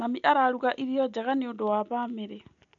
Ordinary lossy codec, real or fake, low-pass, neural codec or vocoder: none; real; 7.2 kHz; none